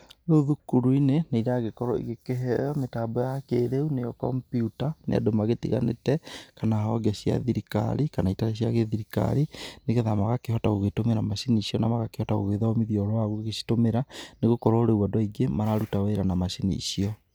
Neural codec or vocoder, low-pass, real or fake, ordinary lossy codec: none; none; real; none